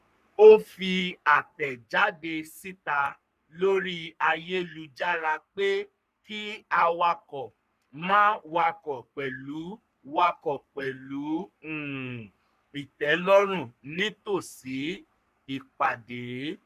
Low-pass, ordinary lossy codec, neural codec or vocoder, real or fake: 14.4 kHz; none; codec, 44.1 kHz, 3.4 kbps, Pupu-Codec; fake